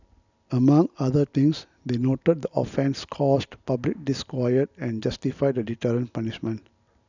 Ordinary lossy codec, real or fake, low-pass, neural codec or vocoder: none; real; 7.2 kHz; none